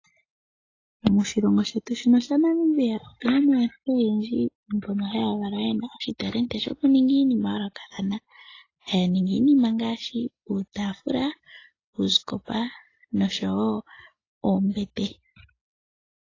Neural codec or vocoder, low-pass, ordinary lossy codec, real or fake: none; 7.2 kHz; AAC, 32 kbps; real